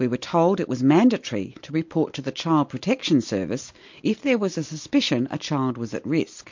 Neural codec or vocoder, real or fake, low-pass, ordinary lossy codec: none; real; 7.2 kHz; MP3, 48 kbps